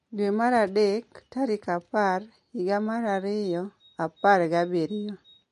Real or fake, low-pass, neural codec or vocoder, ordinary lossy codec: real; 14.4 kHz; none; MP3, 48 kbps